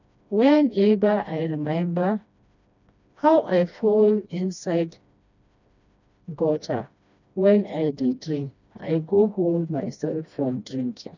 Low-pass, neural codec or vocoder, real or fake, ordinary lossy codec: 7.2 kHz; codec, 16 kHz, 1 kbps, FreqCodec, smaller model; fake; none